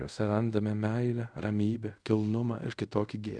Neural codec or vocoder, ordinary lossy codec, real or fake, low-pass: codec, 24 kHz, 0.5 kbps, DualCodec; Opus, 64 kbps; fake; 9.9 kHz